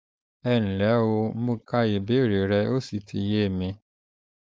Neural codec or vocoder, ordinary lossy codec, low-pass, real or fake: codec, 16 kHz, 4.8 kbps, FACodec; none; none; fake